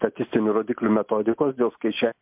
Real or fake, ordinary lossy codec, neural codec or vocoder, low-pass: real; MP3, 32 kbps; none; 3.6 kHz